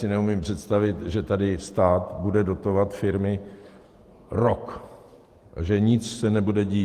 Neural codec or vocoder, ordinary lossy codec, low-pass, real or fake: vocoder, 48 kHz, 128 mel bands, Vocos; Opus, 24 kbps; 14.4 kHz; fake